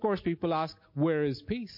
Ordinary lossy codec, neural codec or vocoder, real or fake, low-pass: MP3, 32 kbps; none; real; 5.4 kHz